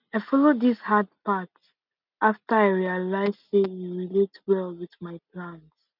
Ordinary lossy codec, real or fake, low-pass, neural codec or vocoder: none; real; 5.4 kHz; none